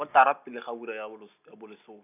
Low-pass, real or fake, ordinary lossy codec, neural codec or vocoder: 3.6 kHz; real; none; none